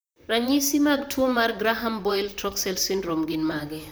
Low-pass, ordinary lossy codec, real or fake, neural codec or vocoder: none; none; fake; vocoder, 44.1 kHz, 128 mel bands, Pupu-Vocoder